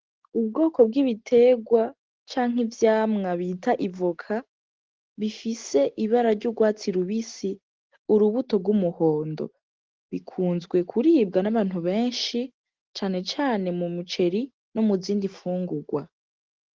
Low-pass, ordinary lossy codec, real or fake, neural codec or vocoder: 7.2 kHz; Opus, 16 kbps; real; none